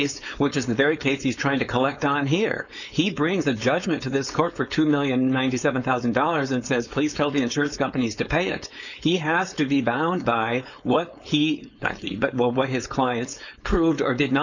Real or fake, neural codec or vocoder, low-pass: fake; codec, 16 kHz, 4.8 kbps, FACodec; 7.2 kHz